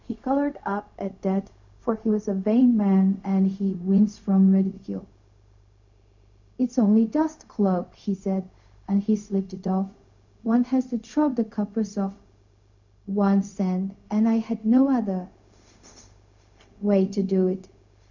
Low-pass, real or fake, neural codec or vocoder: 7.2 kHz; fake; codec, 16 kHz, 0.4 kbps, LongCat-Audio-Codec